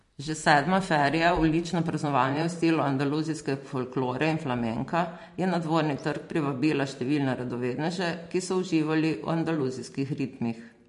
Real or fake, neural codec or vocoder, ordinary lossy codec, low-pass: fake; vocoder, 44.1 kHz, 128 mel bands every 512 samples, BigVGAN v2; MP3, 48 kbps; 14.4 kHz